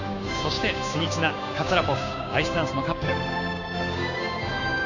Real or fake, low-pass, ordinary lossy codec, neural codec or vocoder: fake; 7.2 kHz; none; codec, 16 kHz, 6 kbps, DAC